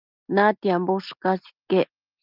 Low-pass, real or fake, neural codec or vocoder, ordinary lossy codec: 5.4 kHz; real; none; Opus, 24 kbps